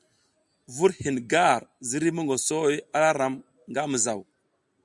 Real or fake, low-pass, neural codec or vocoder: real; 10.8 kHz; none